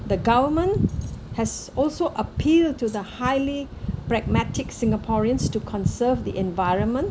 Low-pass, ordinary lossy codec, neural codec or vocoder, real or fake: none; none; none; real